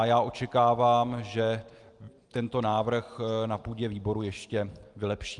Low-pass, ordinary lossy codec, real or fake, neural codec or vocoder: 10.8 kHz; Opus, 32 kbps; real; none